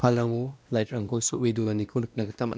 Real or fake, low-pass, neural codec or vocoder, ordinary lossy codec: fake; none; codec, 16 kHz, 1 kbps, X-Codec, WavLM features, trained on Multilingual LibriSpeech; none